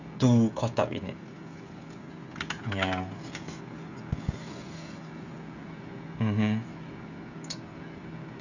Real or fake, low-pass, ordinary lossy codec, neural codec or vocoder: fake; 7.2 kHz; none; codec, 16 kHz, 16 kbps, FreqCodec, smaller model